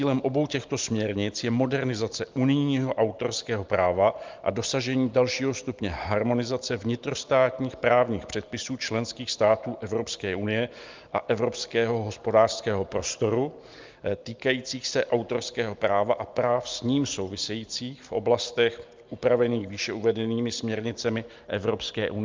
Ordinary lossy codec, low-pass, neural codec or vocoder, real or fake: Opus, 24 kbps; 7.2 kHz; none; real